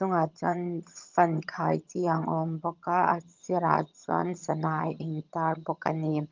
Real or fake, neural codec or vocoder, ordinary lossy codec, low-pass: fake; vocoder, 22.05 kHz, 80 mel bands, HiFi-GAN; Opus, 32 kbps; 7.2 kHz